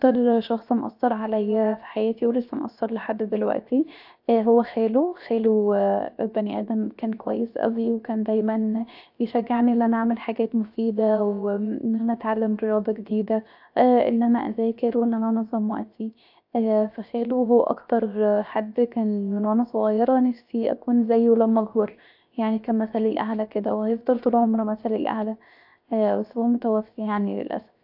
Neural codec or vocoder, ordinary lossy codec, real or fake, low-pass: codec, 16 kHz, 0.7 kbps, FocalCodec; AAC, 48 kbps; fake; 5.4 kHz